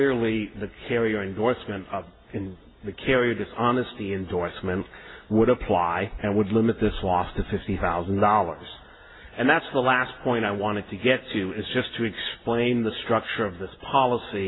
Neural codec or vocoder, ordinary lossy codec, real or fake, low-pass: none; AAC, 16 kbps; real; 7.2 kHz